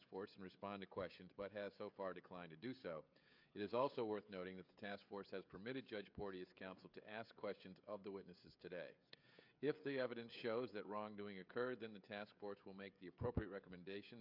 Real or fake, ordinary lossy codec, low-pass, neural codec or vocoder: fake; MP3, 48 kbps; 5.4 kHz; codec, 16 kHz, 16 kbps, FreqCodec, smaller model